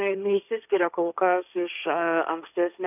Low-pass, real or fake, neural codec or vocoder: 3.6 kHz; fake; codec, 16 kHz, 1.1 kbps, Voila-Tokenizer